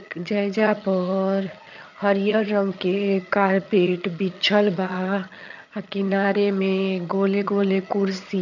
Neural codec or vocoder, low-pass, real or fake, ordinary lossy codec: vocoder, 22.05 kHz, 80 mel bands, HiFi-GAN; 7.2 kHz; fake; none